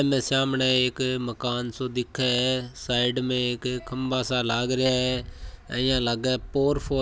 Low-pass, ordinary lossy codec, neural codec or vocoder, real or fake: none; none; none; real